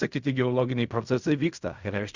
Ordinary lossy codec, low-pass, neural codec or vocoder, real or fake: Opus, 64 kbps; 7.2 kHz; codec, 16 kHz in and 24 kHz out, 0.4 kbps, LongCat-Audio-Codec, fine tuned four codebook decoder; fake